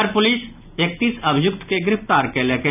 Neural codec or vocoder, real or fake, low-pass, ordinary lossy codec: none; real; 3.6 kHz; none